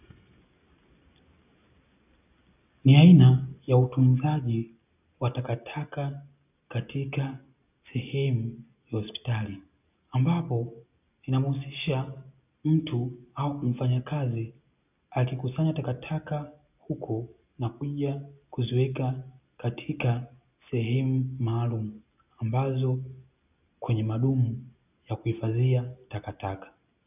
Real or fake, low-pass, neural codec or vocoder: real; 3.6 kHz; none